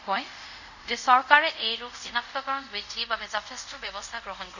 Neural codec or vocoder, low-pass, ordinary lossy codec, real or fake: codec, 24 kHz, 0.5 kbps, DualCodec; 7.2 kHz; none; fake